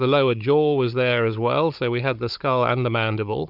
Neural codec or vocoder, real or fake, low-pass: codec, 16 kHz, 16 kbps, FunCodec, trained on Chinese and English, 50 frames a second; fake; 5.4 kHz